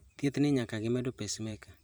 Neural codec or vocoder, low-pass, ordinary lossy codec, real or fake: vocoder, 44.1 kHz, 128 mel bands every 512 samples, BigVGAN v2; none; none; fake